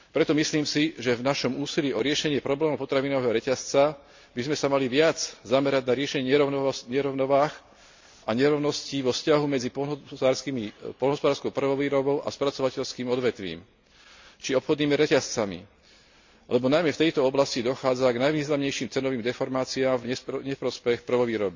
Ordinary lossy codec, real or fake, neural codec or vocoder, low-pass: MP3, 64 kbps; real; none; 7.2 kHz